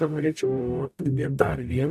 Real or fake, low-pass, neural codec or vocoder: fake; 14.4 kHz; codec, 44.1 kHz, 0.9 kbps, DAC